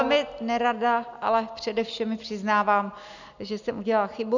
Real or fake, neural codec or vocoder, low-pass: real; none; 7.2 kHz